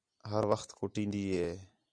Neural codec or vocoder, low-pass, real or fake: none; 9.9 kHz; real